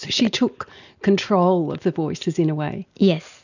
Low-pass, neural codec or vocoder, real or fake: 7.2 kHz; none; real